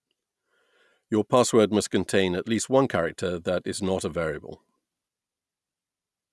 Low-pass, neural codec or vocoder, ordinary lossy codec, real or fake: none; none; none; real